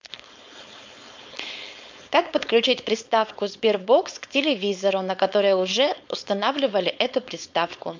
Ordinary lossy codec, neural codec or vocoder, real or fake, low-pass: MP3, 64 kbps; codec, 16 kHz, 4.8 kbps, FACodec; fake; 7.2 kHz